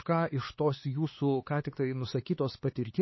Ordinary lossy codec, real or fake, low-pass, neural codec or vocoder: MP3, 24 kbps; fake; 7.2 kHz; codec, 16 kHz, 4 kbps, X-Codec, HuBERT features, trained on LibriSpeech